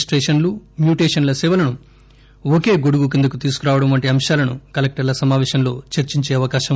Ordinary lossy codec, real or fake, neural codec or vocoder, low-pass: none; real; none; none